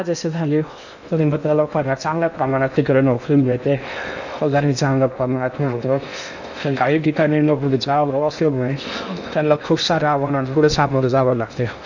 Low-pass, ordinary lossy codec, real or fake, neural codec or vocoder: 7.2 kHz; none; fake; codec, 16 kHz in and 24 kHz out, 0.6 kbps, FocalCodec, streaming, 2048 codes